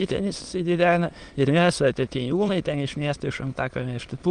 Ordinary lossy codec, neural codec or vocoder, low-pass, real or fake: Opus, 24 kbps; autoencoder, 22.05 kHz, a latent of 192 numbers a frame, VITS, trained on many speakers; 9.9 kHz; fake